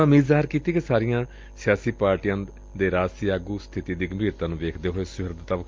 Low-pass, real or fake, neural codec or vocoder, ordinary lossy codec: 7.2 kHz; real; none; Opus, 24 kbps